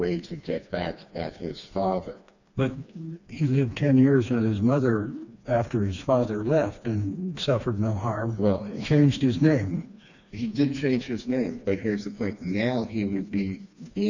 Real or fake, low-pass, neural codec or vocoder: fake; 7.2 kHz; codec, 16 kHz, 2 kbps, FreqCodec, smaller model